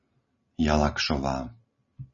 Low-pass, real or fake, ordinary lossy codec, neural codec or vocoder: 7.2 kHz; real; MP3, 32 kbps; none